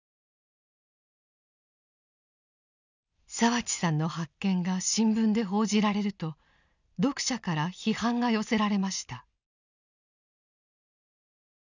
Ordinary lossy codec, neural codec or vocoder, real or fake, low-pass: none; none; real; 7.2 kHz